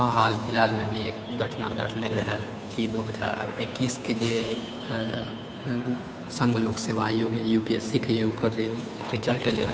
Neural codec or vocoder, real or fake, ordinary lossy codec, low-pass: codec, 16 kHz, 2 kbps, FunCodec, trained on Chinese and English, 25 frames a second; fake; none; none